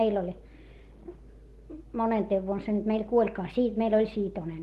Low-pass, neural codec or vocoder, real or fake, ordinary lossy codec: 14.4 kHz; none; real; Opus, 16 kbps